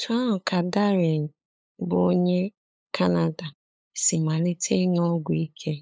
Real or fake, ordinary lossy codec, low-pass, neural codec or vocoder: fake; none; none; codec, 16 kHz, 8 kbps, FunCodec, trained on LibriTTS, 25 frames a second